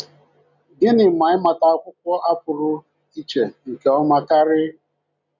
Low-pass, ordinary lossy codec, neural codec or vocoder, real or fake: 7.2 kHz; none; none; real